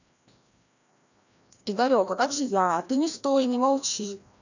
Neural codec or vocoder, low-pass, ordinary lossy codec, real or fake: codec, 16 kHz, 1 kbps, FreqCodec, larger model; 7.2 kHz; none; fake